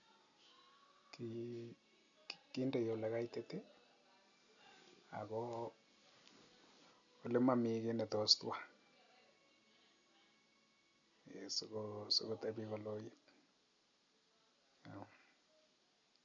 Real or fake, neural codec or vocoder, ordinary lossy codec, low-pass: real; none; none; 7.2 kHz